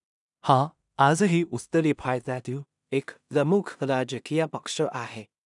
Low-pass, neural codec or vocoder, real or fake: 10.8 kHz; codec, 16 kHz in and 24 kHz out, 0.4 kbps, LongCat-Audio-Codec, two codebook decoder; fake